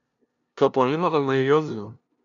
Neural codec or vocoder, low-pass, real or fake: codec, 16 kHz, 0.5 kbps, FunCodec, trained on LibriTTS, 25 frames a second; 7.2 kHz; fake